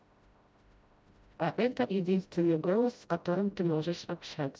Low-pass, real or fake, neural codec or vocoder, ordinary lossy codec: none; fake; codec, 16 kHz, 0.5 kbps, FreqCodec, smaller model; none